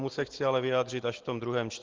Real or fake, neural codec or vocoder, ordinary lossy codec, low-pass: real; none; Opus, 16 kbps; 7.2 kHz